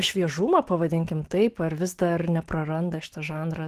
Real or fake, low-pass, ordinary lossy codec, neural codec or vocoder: fake; 14.4 kHz; Opus, 16 kbps; autoencoder, 48 kHz, 128 numbers a frame, DAC-VAE, trained on Japanese speech